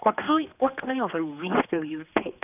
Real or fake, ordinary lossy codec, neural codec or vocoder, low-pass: fake; none; codec, 16 kHz, 2 kbps, X-Codec, HuBERT features, trained on general audio; 3.6 kHz